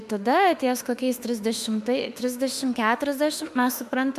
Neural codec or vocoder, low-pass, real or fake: autoencoder, 48 kHz, 32 numbers a frame, DAC-VAE, trained on Japanese speech; 14.4 kHz; fake